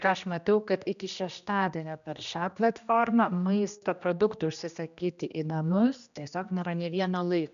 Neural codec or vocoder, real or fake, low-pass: codec, 16 kHz, 1 kbps, X-Codec, HuBERT features, trained on general audio; fake; 7.2 kHz